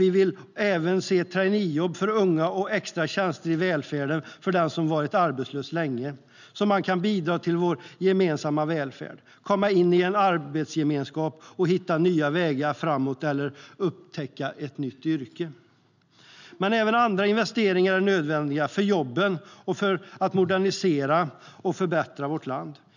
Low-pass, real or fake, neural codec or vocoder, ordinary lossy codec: 7.2 kHz; real; none; none